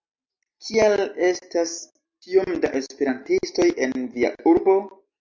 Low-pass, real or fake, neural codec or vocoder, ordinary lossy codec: 7.2 kHz; real; none; MP3, 48 kbps